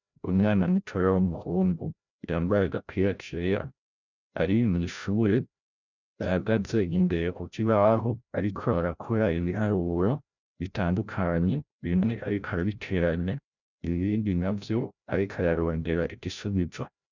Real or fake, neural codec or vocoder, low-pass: fake; codec, 16 kHz, 0.5 kbps, FreqCodec, larger model; 7.2 kHz